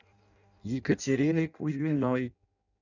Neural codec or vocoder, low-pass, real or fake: codec, 16 kHz in and 24 kHz out, 0.6 kbps, FireRedTTS-2 codec; 7.2 kHz; fake